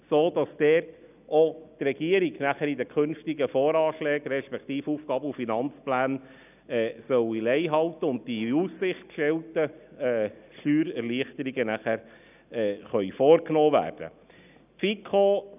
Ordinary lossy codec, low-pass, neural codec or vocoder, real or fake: none; 3.6 kHz; none; real